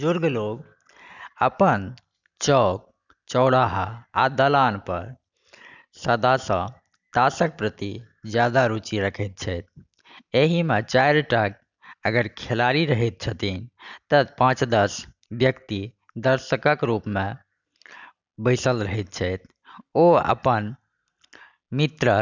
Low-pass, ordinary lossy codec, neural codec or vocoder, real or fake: 7.2 kHz; none; none; real